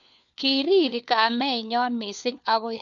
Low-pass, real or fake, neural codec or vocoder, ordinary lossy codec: 7.2 kHz; fake; codec, 16 kHz, 4 kbps, FunCodec, trained on LibriTTS, 50 frames a second; none